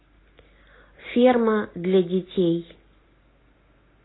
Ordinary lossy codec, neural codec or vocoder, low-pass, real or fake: AAC, 16 kbps; none; 7.2 kHz; real